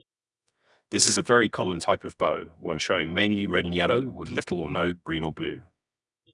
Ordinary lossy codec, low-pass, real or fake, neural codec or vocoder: none; 10.8 kHz; fake; codec, 24 kHz, 0.9 kbps, WavTokenizer, medium music audio release